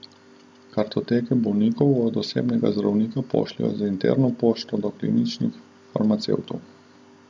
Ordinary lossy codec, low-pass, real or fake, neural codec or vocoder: none; none; real; none